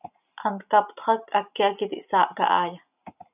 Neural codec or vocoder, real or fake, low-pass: none; real; 3.6 kHz